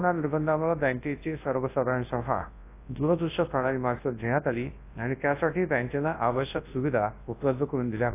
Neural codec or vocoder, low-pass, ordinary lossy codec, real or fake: codec, 24 kHz, 0.9 kbps, WavTokenizer, large speech release; 3.6 kHz; AAC, 24 kbps; fake